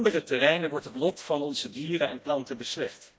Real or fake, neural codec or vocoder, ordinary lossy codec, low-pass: fake; codec, 16 kHz, 1 kbps, FreqCodec, smaller model; none; none